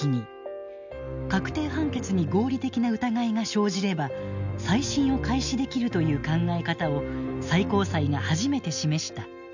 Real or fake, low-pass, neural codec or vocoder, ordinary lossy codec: real; 7.2 kHz; none; none